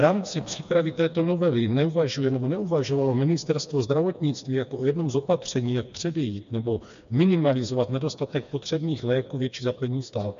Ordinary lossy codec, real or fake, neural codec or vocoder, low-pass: MP3, 64 kbps; fake; codec, 16 kHz, 2 kbps, FreqCodec, smaller model; 7.2 kHz